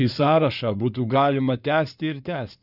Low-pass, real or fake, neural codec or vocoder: 5.4 kHz; fake; codec, 16 kHz in and 24 kHz out, 2.2 kbps, FireRedTTS-2 codec